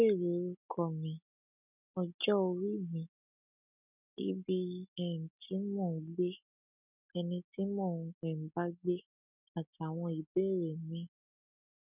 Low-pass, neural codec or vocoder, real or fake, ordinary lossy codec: 3.6 kHz; none; real; none